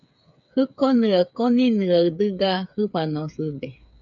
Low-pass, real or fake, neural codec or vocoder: 7.2 kHz; fake; codec, 16 kHz, 8 kbps, FreqCodec, smaller model